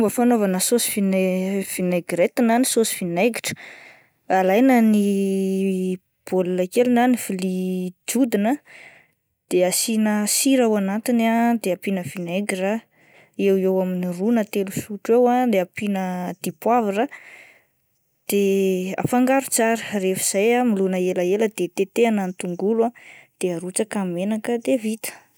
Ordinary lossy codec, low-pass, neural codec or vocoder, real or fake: none; none; none; real